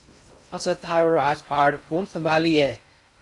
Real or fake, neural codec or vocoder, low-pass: fake; codec, 16 kHz in and 24 kHz out, 0.6 kbps, FocalCodec, streaming, 2048 codes; 10.8 kHz